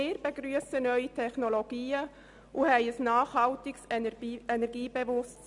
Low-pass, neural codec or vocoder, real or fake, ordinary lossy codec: 10.8 kHz; none; real; none